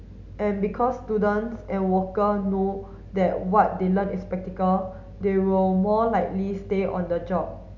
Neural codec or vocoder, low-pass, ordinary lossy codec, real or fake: none; 7.2 kHz; none; real